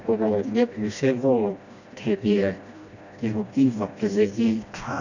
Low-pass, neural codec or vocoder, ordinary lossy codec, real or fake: 7.2 kHz; codec, 16 kHz, 1 kbps, FreqCodec, smaller model; none; fake